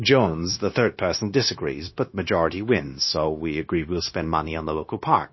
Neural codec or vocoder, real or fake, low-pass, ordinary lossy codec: codec, 16 kHz, 0.9 kbps, LongCat-Audio-Codec; fake; 7.2 kHz; MP3, 24 kbps